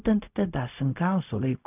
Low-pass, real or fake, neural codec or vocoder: 3.6 kHz; fake; codec, 16 kHz, 0.4 kbps, LongCat-Audio-Codec